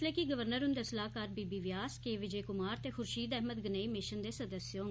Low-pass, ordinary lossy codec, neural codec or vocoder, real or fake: none; none; none; real